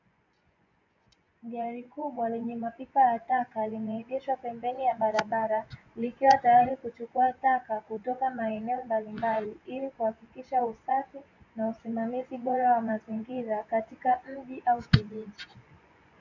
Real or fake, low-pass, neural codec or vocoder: fake; 7.2 kHz; vocoder, 24 kHz, 100 mel bands, Vocos